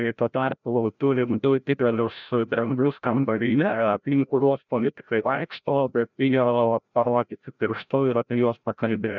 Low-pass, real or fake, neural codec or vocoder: 7.2 kHz; fake; codec, 16 kHz, 0.5 kbps, FreqCodec, larger model